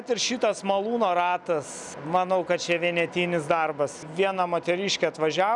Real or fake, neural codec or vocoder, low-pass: real; none; 10.8 kHz